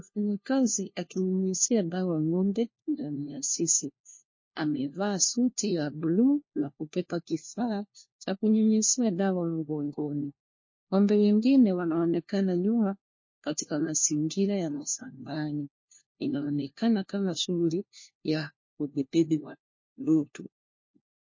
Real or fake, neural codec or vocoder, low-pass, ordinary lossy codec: fake; codec, 16 kHz, 1 kbps, FunCodec, trained on LibriTTS, 50 frames a second; 7.2 kHz; MP3, 32 kbps